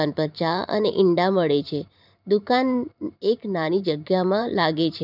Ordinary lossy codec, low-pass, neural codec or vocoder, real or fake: none; 5.4 kHz; vocoder, 44.1 kHz, 128 mel bands every 512 samples, BigVGAN v2; fake